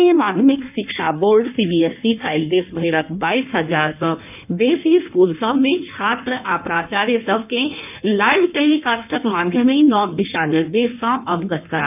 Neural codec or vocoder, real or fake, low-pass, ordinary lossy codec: codec, 16 kHz in and 24 kHz out, 1.1 kbps, FireRedTTS-2 codec; fake; 3.6 kHz; none